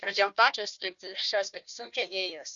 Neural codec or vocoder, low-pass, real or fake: codec, 16 kHz, 1 kbps, FunCodec, trained on Chinese and English, 50 frames a second; 7.2 kHz; fake